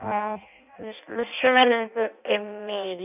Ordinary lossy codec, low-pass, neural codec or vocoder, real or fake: none; 3.6 kHz; codec, 16 kHz in and 24 kHz out, 0.6 kbps, FireRedTTS-2 codec; fake